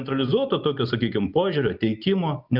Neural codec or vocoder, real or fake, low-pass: none; real; 5.4 kHz